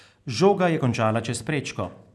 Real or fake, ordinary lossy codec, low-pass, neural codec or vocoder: real; none; none; none